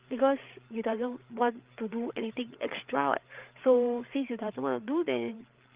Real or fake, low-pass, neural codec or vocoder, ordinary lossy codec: fake; 3.6 kHz; codec, 16 kHz, 8 kbps, FreqCodec, larger model; Opus, 24 kbps